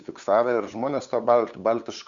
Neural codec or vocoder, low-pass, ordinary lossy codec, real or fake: codec, 16 kHz, 8 kbps, FunCodec, trained on LibriTTS, 25 frames a second; 7.2 kHz; Opus, 64 kbps; fake